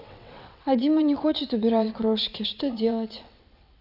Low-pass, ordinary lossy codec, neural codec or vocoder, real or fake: 5.4 kHz; none; vocoder, 22.05 kHz, 80 mel bands, WaveNeXt; fake